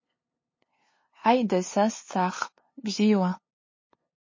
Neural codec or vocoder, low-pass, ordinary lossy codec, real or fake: codec, 16 kHz, 2 kbps, FunCodec, trained on LibriTTS, 25 frames a second; 7.2 kHz; MP3, 32 kbps; fake